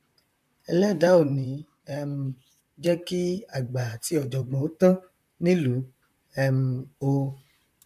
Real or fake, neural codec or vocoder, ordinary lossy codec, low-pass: fake; vocoder, 44.1 kHz, 128 mel bands, Pupu-Vocoder; none; 14.4 kHz